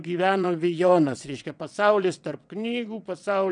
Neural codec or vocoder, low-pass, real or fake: vocoder, 22.05 kHz, 80 mel bands, WaveNeXt; 9.9 kHz; fake